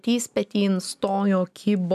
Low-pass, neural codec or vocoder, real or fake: 14.4 kHz; none; real